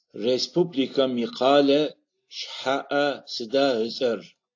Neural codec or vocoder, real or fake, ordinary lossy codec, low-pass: none; real; AAC, 48 kbps; 7.2 kHz